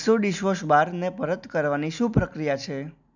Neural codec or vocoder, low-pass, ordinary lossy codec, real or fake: none; 7.2 kHz; none; real